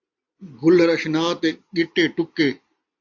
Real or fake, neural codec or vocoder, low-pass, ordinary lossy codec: real; none; 7.2 kHz; AAC, 48 kbps